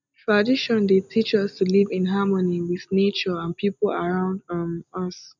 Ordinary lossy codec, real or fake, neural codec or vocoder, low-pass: none; real; none; 7.2 kHz